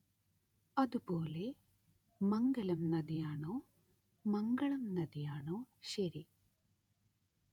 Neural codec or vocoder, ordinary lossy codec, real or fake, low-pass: vocoder, 48 kHz, 128 mel bands, Vocos; none; fake; 19.8 kHz